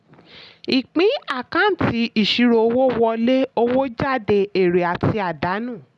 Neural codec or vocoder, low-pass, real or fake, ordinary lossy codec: none; none; real; none